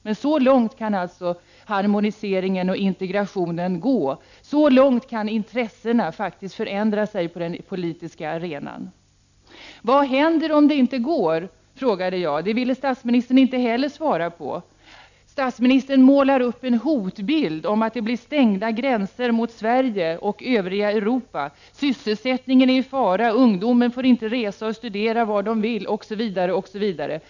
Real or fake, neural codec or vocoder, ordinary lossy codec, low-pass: real; none; none; 7.2 kHz